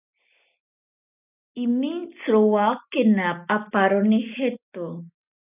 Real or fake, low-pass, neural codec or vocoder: real; 3.6 kHz; none